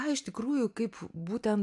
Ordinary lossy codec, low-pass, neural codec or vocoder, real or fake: AAC, 64 kbps; 10.8 kHz; none; real